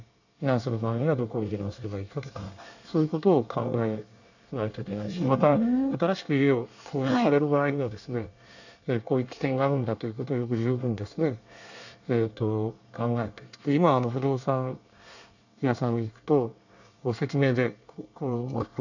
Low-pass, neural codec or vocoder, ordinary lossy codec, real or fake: 7.2 kHz; codec, 24 kHz, 1 kbps, SNAC; none; fake